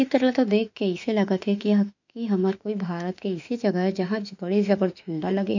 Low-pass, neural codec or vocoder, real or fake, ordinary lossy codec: 7.2 kHz; autoencoder, 48 kHz, 32 numbers a frame, DAC-VAE, trained on Japanese speech; fake; none